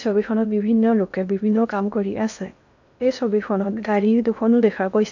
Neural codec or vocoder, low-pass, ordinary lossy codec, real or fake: codec, 16 kHz in and 24 kHz out, 0.6 kbps, FocalCodec, streaming, 2048 codes; 7.2 kHz; none; fake